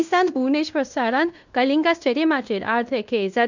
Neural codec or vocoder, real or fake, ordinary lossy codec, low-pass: codec, 16 kHz in and 24 kHz out, 0.9 kbps, LongCat-Audio-Codec, fine tuned four codebook decoder; fake; none; 7.2 kHz